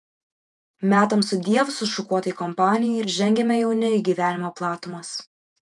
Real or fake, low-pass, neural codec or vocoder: fake; 10.8 kHz; vocoder, 48 kHz, 128 mel bands, Vocos